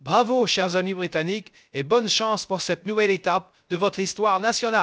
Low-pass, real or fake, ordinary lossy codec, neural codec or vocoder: none; fake; none; codec, 16 kHz, 0.3 kbps, FocalCodec